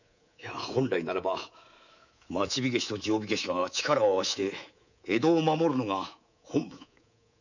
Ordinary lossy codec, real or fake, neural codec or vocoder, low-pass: none; fake; codec, 24 kHz, 3.1 kbps, DualCodec; 7.2 kHz